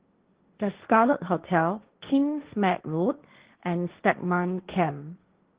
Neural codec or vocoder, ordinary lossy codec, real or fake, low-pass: codec, 16 kHz, 1.1 kbps, Voila-Tokenizer; Opus, 16 kbps; fake; 3.6 kHz